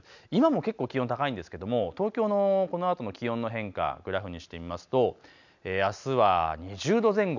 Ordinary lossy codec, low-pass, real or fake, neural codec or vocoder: none; 7.2 kHz; real; none